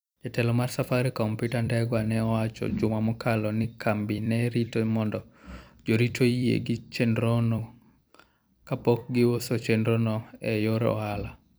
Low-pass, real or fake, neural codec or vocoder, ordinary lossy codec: none; fake; vocoder, 44.1 kHz, 128 mel bands every 256 samples, BigVGAN v2; none